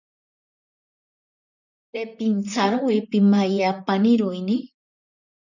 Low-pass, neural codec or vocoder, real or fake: 7.2 kHz; vocoder, 44.1 kHz, 128 mel bands, Pupu-Vocoder; fake